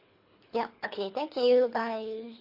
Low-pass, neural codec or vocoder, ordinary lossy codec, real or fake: 5.4 kHz; codec, 24 kHz, 3 kbps, HILCodec; MP3, 32 kbps; fake